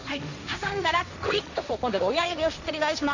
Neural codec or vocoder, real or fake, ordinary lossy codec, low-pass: codec, 16 kHz, 1.1 kbps, Voila-Tokenizer; fake; none; 7.2 kHz